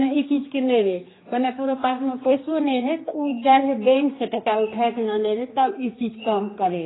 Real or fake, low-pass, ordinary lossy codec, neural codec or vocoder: fake; 7.2 kHz; AAC, 16 kbps; codec, 16 kHz, 2 kbps, X-Codec, HuBERT features, trained on general audio